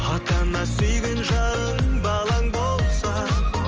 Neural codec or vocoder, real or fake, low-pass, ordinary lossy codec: none; real; 7.2 kHz; Opus, 24 kbps